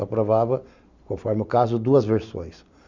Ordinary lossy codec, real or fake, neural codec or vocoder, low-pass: Opus, 64 kbps; real; none; 7.2 kHz